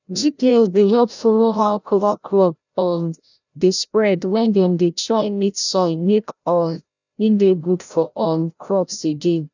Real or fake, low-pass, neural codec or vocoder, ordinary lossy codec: fake; 7.2 kHz; codec, 16 kHz, 0.5 kbps, FreqCodec, larger model; none